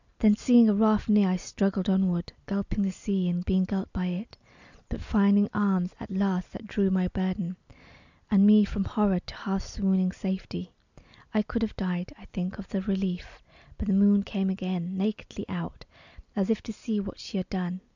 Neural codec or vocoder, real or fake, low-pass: none; real; 7.2 kHz